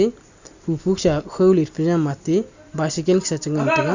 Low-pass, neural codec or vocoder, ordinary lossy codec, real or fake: 7.2 kHz; none; Opus, 64 kbps; real